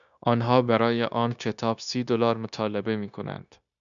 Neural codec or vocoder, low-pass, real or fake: codec, 16 kHz, 0.9 kbps, LongCat-Audio-Codec; 7.2 kHz; fake